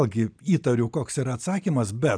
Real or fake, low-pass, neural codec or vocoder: real; 9.9 kHz; none